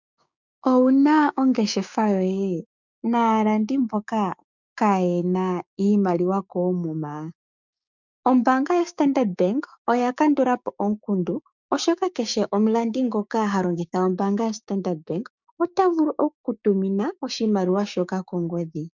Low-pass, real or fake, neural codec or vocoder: 7.2 kHz; fake; codec, 16 kHz, 6 kbps, DAC